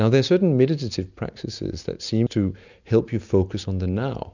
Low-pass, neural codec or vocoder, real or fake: 7.2 kHz; none; real